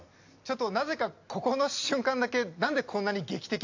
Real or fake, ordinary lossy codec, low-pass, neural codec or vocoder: real; AAC, 48 kbps; 7.2 kHz; none